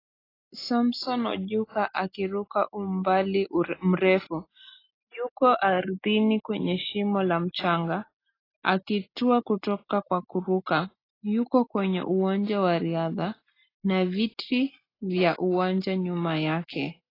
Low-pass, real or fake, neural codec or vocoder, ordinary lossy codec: 5.4 kHz; real; none; AAC, 24 kbps